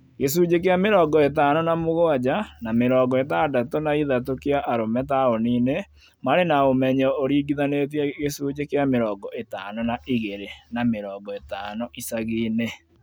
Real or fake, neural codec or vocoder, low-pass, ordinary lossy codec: real; none; none; none